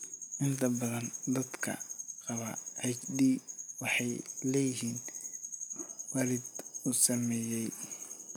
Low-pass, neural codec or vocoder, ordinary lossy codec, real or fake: none; none; none; real